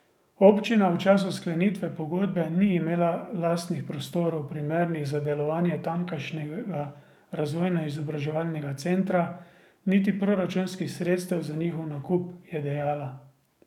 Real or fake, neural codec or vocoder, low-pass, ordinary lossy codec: fake; codec, 44.1 kHz, 7.8 kbps, DAC; 19.8 kHz; none